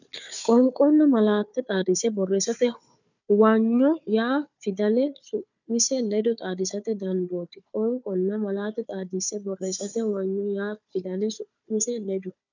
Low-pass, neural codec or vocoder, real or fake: 7.2 kHz; codec, 16 kHz, 4 kbps, FunCodec, trained on Chinese and English, 50 frames a second; fake